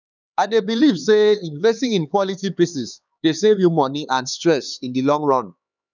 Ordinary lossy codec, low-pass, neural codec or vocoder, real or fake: none; 7.2 kHz; codec, 16 kHz, 4 kbps, X-Codec, HuBERT features, trained on LibriSpeech; fake